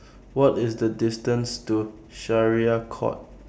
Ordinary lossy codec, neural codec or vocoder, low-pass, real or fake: none; none; none; real